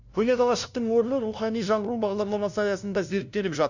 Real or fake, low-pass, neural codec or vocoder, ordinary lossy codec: fake; 7.2 kHz; codec, 16 kHz, 0.5 kbps, FunCodec, trained on LibriTTS, 25 frames a second; none